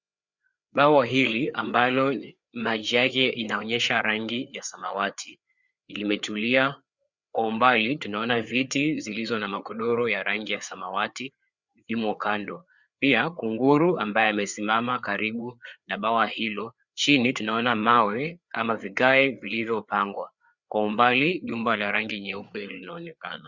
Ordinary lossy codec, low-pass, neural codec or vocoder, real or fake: Opus, 64 kbps; 7.2 kHz; codec, 16 kHz, 4 kbps, FreqCodec, larger model; fake